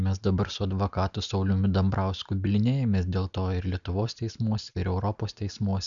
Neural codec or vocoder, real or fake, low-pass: none; real; 7.2 kHz